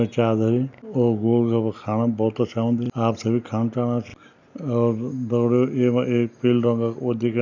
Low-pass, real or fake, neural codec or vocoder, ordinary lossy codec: 7.2 kHz; real; none; none